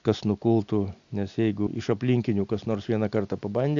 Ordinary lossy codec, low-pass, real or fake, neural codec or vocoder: AAC, 64 kbps; 7.2 kHz; real; none